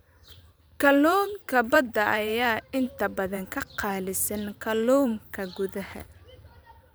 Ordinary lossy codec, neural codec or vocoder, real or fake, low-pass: none; vocoder, 44.1 kHz, 128 mel bands every 512 samples, BigVGAN v2; fake; none